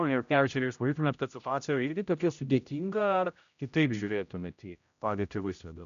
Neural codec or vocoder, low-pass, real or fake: codec, 16 kHz, 0.5 kbps, X-Codec, HuBERT features, trained on general audio; 7.2 kHz; fake